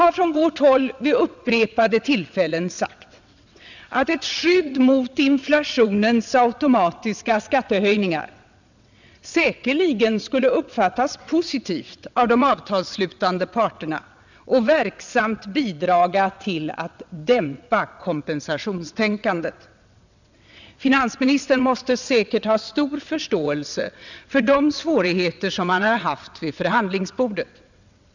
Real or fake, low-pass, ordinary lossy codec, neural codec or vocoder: fake; 7.2 kHz; none; vocoder, 22.05 kHz, 80 mel bands, WaveNeXt